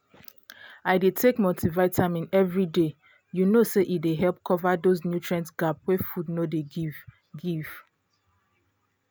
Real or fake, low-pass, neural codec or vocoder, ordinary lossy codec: real; none; none; none